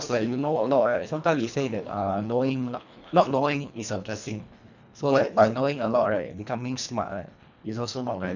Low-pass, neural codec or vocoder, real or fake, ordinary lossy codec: 7.2 kHz; codec, 24 kHz, 1.5 kbps, HILCodec; fake; none